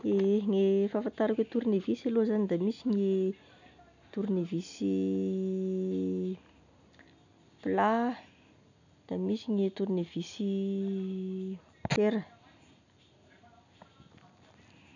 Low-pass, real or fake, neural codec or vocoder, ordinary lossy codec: 7.2 kHz; real; none; none